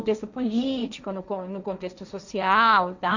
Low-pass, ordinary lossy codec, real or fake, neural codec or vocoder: 7.2 kHz; none; fake; codec, 16 kHz, 1.1 kbps, Voila-Tokenizer